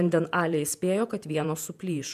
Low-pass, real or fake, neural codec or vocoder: 14.4 kHz; fake; vocoder, 48 kHz, 128 mel bands, Vocos